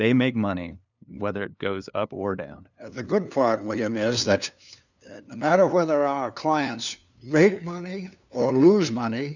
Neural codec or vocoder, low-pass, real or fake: codec, 16 kHz, 2 kbps, FunCodec, trained on LibriTTS, 25 frames a second; 7.2 kHz; fake